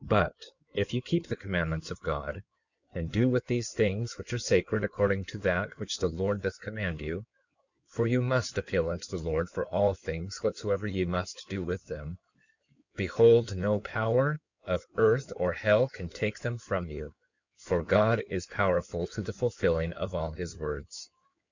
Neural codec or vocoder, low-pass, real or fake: codec, 16 kHz in and 24 kHz out, 2.2 kbps, FireRedTTS-2 codec; 7.2 kHz; fake